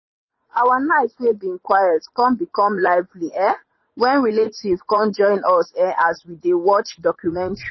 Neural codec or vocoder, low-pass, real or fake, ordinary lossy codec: vocoder, 44.1 kHz, 80 mel bands, Vocos; 7.2 kHz; fake; MP3, 24 kbps